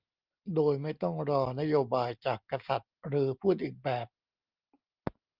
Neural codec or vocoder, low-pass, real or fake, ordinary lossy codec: none; 5.4 kHz; real; Opus, 24 kbps